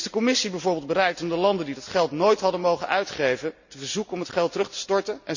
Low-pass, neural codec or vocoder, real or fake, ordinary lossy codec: 7.2 kHz; none; real; none